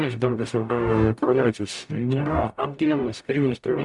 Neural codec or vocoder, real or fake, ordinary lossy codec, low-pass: codec, 44.1 kHz, 0.9 kbps, DAC; fake; MP3, 96 kbps; 10.8 kHz